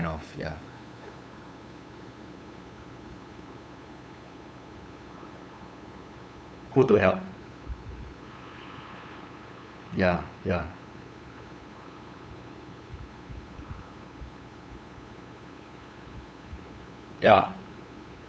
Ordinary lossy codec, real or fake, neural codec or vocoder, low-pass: none; fake; codec, 16 kHz, 8 kbps, FunCodec, trained on LibriTTS, 25 frames a second; none